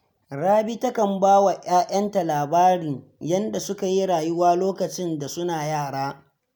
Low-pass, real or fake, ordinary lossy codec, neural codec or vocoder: none; real; none; none